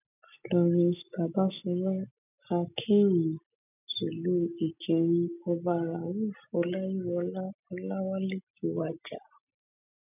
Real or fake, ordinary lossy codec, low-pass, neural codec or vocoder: real; none; 3.6 kHz; none